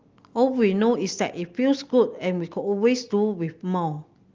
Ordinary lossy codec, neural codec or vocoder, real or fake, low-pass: Opus, 32 kbps; none; real; 7.2 kHz